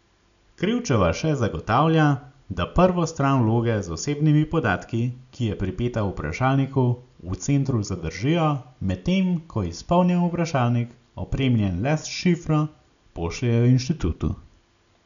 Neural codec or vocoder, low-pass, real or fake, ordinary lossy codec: none; 7.2 kHz; real; none